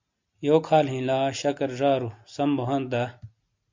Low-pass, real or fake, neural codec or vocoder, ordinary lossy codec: 7.2 kHz; real; none; MP3, 64 kbps